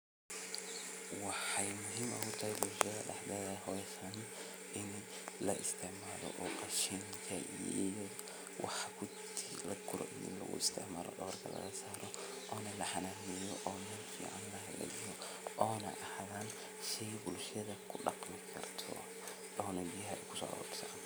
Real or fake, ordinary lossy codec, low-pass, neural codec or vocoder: real; none; none; none